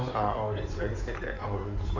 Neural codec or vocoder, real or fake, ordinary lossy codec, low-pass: codec, 16 kHz in and 24 kHz out, 2.2 kbps, FireRedTTS-2 codec; fake; none; 7.2 kHz